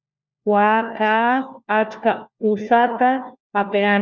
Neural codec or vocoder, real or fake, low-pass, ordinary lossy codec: codec, 16 kHz, 1 kbps, FunCodec, trained on LibriTTS, 50 frames a second; fake; 7.2 kHz; Opus, 64 kbps